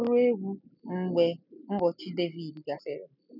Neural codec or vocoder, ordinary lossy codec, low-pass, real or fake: none; none; 5.4 kHz; real